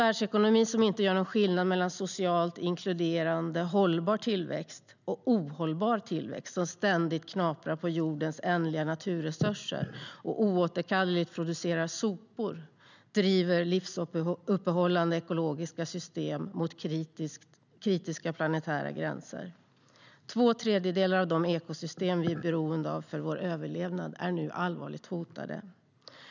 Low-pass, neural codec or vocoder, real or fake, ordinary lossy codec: 7.2 kHz; none; real; none